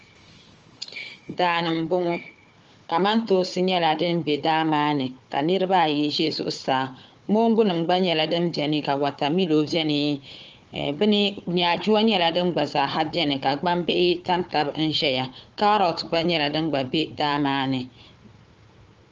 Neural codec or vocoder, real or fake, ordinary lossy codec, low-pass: codec, 16 kHz, 4 kbps, FunCodec, trained on Chinese and English, 50 frames a second; fake; Opus, 24 kbps; 7.2 kHz